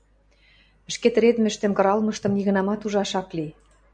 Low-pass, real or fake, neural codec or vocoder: 9.9 kHz; real; none